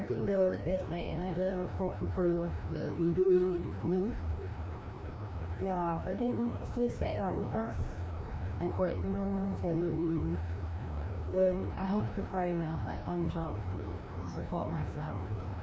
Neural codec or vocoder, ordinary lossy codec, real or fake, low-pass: codec, 16 kHz, 1 kbps, FreqCodec, larger model; none; fake; none